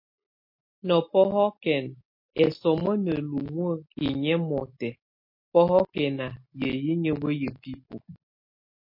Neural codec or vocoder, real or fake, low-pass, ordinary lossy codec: none; real; 5.4 kHz; MP3, 32 kbps